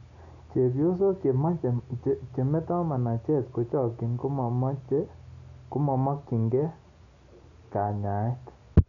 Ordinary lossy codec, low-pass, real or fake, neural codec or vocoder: none; 7.2 kHz; real; none